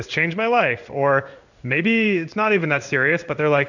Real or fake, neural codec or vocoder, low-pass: fake; codec, 16 kHz in and 24 kHz out, 1 kbps, XY-Tokenizer; 7.2 kHz